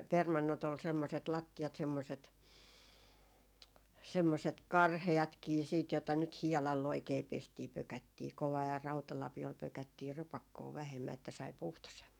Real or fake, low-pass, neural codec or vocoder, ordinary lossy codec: fake; 19.8 kHz; codec, 44.1 kHz, 7.8 kbps, DAC; none